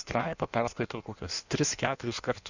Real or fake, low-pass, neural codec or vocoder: fake; 7.2 kHz; codec, 16 kHz in and 24 kHz out, 1.1 kbps, FireRedTTS-2 codec